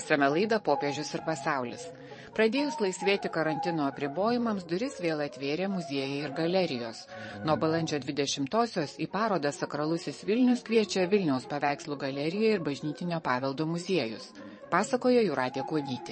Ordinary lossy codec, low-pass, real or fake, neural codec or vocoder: MP3, 32 kbps; 9.9 kHz; fake; vocoder, 22.05 kHz, 80 mel bands, Vocos